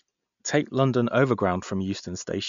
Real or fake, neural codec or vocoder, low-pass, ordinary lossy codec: real; none; 7.2 kHz; MP3, 64 kbps